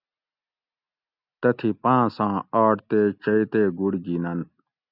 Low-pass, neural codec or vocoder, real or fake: 5.4 kHz; none; real